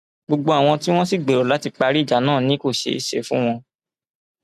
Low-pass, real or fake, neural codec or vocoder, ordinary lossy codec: 14.4 kHz; real; none; none